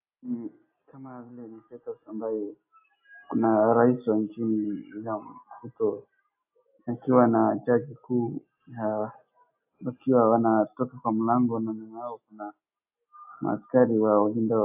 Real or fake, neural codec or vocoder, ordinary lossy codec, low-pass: real; none; AAC, 32 kbps; 3.6 kHz